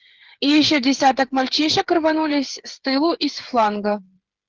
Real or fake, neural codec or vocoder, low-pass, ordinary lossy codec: fake; codec, 16 kHz, 8 kbps, FreqCodec, smaller model; 7.2 kHz; Opus, 16 kbps